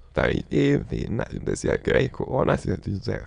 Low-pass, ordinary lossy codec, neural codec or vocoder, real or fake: 9.9 kHz; none; autoencoder, 22.05 kHz, a latent of 192 numbers a frame, VITS, trained on many speakers; fake